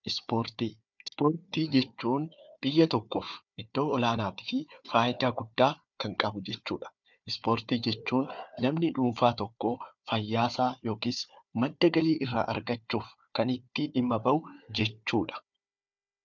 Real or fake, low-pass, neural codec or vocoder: fake; 7.2 kHz; codec, 16 kHz, 4 kbps, FunCodec, trained on Chinese and English, 50 frames a second